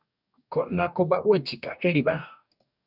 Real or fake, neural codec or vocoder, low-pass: fake; codec, 44.1 kHz, 2.6 kbps, DAC; 5.4 kHz